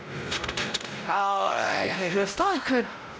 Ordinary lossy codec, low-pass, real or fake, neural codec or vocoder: none; none; fake; codec, 16 kHz, 0.5 kbps, X-Codec, WavLM features, trained on Multilingual LibriSpeech